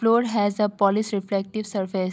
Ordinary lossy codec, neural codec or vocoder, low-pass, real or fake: none; none; none; real